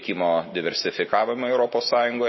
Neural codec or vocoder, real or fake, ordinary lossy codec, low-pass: none; real; MP3, 24 kbps; 7.2 kHz